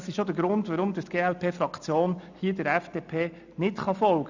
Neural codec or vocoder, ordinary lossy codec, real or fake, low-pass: none; none; real; 7.2 kHz